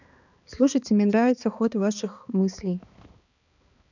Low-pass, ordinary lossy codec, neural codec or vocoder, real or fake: 7.2 kHz; none; codec, 16 kHz, 2 kbps, X-Codec, HuBERT features, trained on balanced general audio; fake